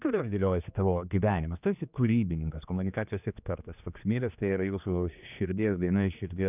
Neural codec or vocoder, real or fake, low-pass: codec, 16 kHz, 2 kbps, X-Codec, HuBERT features, trained on general audio; fake; 3.6 kHz